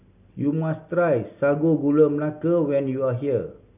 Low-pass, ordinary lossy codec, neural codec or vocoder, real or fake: 3.6 kHz; none; none; real